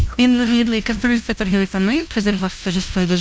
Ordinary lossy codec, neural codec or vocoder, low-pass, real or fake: none; codec, 16 kHz, 0.5 kbps, FunCodec, trained on LibriTTS, 25 frames a second; none; fake